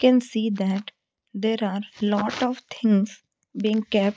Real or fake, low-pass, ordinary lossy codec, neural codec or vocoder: real; none; none; none